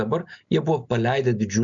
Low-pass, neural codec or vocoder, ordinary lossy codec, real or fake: 7.2 kHz; none; MP3, 96 kbps; real